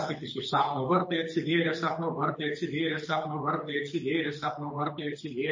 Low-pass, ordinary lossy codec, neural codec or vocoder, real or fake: 7.2 kHz; MP3, 32 kbps; codec, 24 kHz, 6 kbps, HILCodec; fake